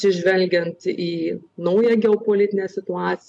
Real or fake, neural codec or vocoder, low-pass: real; none; 10.8 kHz